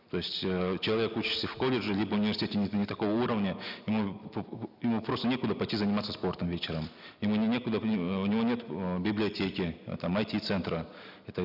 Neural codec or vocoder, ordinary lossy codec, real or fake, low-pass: none; none; real; 5.4 kHz